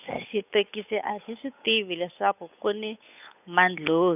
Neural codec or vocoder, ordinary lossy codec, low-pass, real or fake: codec, 16 kHz, 8 kbps, FunCodec, trained on Chinese and English, 25 frames a second; none; 3.6 kHz; fake